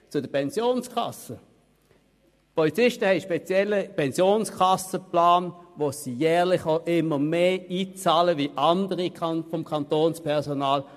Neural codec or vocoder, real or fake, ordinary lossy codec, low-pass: none; real; MP3, 64 kbps; 14.4 kHz